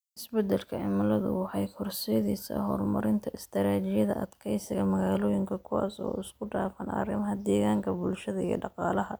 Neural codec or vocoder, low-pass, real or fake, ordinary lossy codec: none; none; real; none